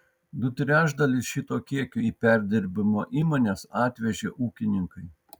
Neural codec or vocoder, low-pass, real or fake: none; 19.8 kHz; real